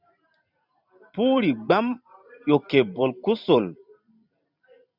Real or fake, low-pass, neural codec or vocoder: fake; 5.4 kHz; vocoder, 44.1 kHz, 128 mel bands every 512 samples, BigVGAN v2